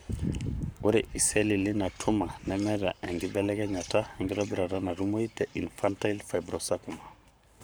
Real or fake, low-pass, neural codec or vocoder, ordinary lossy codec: fake; none; codec, 44.1 kHz, 7.8 kbps, Pupu-Codec; none